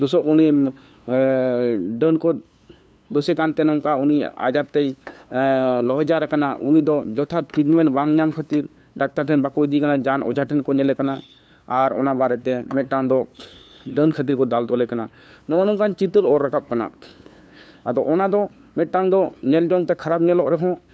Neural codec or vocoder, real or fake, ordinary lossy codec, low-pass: codec, 16 kHz, 2 kbps, FunCodec, trained on LibriTTS, 25 frames a second; fake; none; none